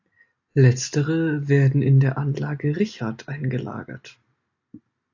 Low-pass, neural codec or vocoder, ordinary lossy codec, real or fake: 7.2 kHz; none; AAC, 48 kbps; real